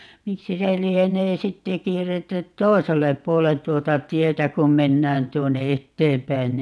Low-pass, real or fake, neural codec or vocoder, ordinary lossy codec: none; fake; vocoder, 22.05 kHz, 80 mel bands, WaveNeXt; none